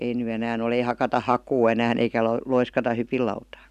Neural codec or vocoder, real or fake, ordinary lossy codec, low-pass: none; real; none; 14.4 kHz